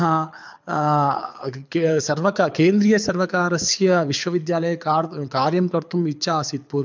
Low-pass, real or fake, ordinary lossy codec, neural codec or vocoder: 7.2 kHz; fake; MP3, 64 kbps; codec, 24 kHz, 6 kbps, HILCodec